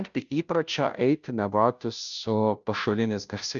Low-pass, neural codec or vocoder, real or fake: 7.2 kHz; codec, 16 kHz, 0.5 kbps, FunCodec, trained on Chinese and English, 25 frames a second; fake